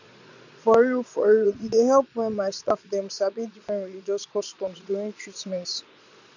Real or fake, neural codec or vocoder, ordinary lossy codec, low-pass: fake; vocoder, 22.05 kHz, 80 mel bands, Vocos; none; 7.2 kHz